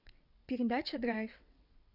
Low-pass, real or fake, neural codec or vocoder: 5.4 kHz; fake; vocoder, 22.05 kHz, 80 mel bands, WaveNeXt